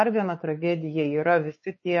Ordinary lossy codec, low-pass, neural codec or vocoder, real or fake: MP3, 32 kbps; 7.2 kHz; codec, 16 kHz, 4 kbps, X-Codec, WavLM features, trained on Multilingual LibriSpeech; fake